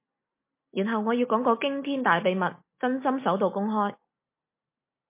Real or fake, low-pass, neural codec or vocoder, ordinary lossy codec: real; 3.6 kHz; none; MP3, 24 kbps